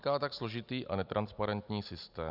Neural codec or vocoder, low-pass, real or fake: none; 5.4 kHz; real